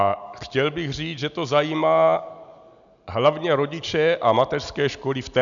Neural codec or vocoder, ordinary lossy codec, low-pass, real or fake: none; MP3, 96 kbps; 7.2 kHz; real